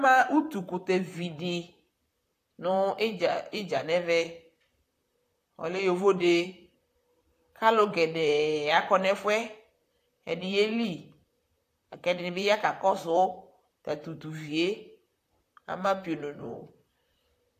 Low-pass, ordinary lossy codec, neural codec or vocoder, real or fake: 14.4 kHz; AAC, 64 kbps; vocoder, 44.1 kHz, 128 mel bands, Pupu-Vocoder; fake